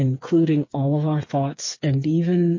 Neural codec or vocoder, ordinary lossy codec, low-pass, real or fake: codec, 16 kHz, 4 kbps, FreqCodec, smaller model; MP3, 32 kbps; 7.2 kHz; fake